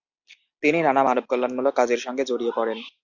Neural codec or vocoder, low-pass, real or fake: none; 7.2 kHz; real